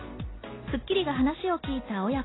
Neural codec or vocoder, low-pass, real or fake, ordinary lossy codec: none; 7.2 kHz; real; AAC, 16 kbps